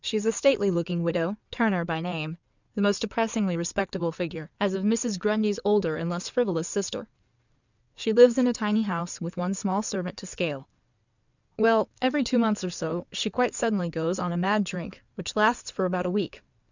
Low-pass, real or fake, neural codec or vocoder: 7.2 kHz; fake; codec, 16 kHz in and 24 kHz out, 2.2 kbps, FireRedTTS-2 codec